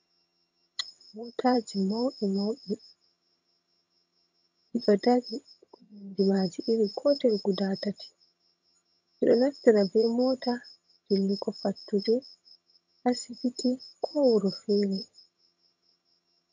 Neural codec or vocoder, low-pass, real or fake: vocoder, 22.05 kHz, 80 mel bands, HiFi-GAN; 7.2 kHz; fake